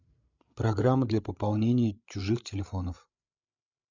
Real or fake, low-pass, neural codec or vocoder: fake; 7.2 kHz; codec, 16 kHz, 8 kbps, FreqCodec, larger model